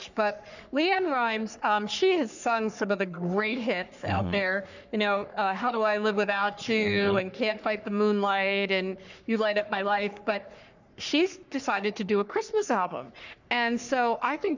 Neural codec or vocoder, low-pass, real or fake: codec, 44.1 kHz, 3.4 kbps, Pupu-Codec; 7.2 kHz; fake